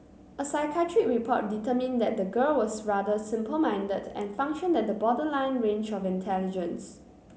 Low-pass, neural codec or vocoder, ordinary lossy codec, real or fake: none; none; none; real